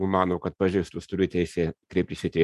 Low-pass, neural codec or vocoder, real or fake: 14.4 kHz; none; real